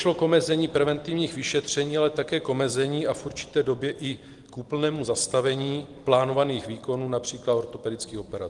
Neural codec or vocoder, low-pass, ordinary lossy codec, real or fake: none; 10.8 kHz; Opus, 24 kbps; real